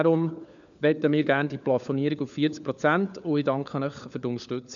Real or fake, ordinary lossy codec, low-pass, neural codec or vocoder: fake; none; 7.2 kHz; codec, 16 kHz, 4 kbps, FunCodec, trained on LibriTTS, 50 frames a second